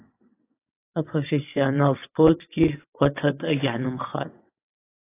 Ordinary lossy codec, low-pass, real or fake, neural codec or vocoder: AAC, 24 kbps; 3.6 kHz; fake; vocoder, 22.05 kHz, 80 mel bands, WaveNeXt